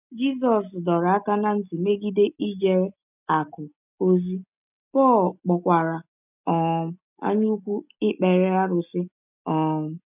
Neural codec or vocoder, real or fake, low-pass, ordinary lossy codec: none; real; 3.6 kHz; none